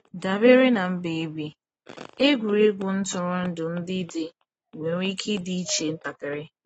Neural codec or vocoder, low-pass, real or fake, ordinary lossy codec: none; 19.8 kHz; real; AAC, 24 kbps